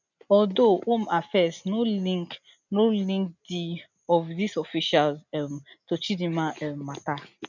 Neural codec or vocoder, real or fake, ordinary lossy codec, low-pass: none; real; none; 7.2 kHz